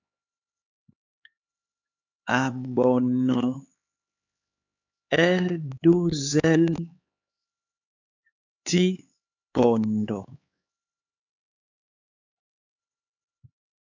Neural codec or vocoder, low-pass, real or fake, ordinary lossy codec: codec, 16 kHz, 4 kbps, X-Codec, HuBERT features, trained on LibriSpeech; 7.2 kHz; fake; AAC, 48 kbps